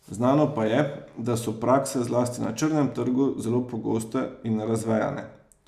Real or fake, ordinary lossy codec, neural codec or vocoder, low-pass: real; none; none; 14.4 kHz